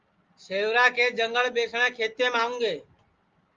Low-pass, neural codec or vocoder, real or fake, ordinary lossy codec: 7.2 kHz; none; real; Opus, 32 kbps